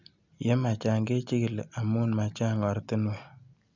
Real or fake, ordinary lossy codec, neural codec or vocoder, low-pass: real; none; none; 7.2 kHz